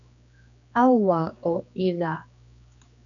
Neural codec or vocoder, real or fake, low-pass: codec, 16 kHz, 2 kbps, X-Codec, HuBERT features, trained on general audio; fake; 7.2 kHz